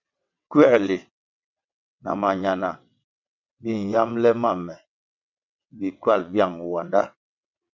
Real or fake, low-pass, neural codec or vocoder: fake; 7.2 kHz; vocoder, 22.05 kHz, 80 mel bands, WaveNeXt